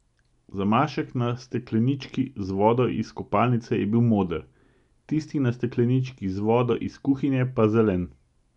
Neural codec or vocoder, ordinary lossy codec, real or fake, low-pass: none; none; real; 10.8 kHz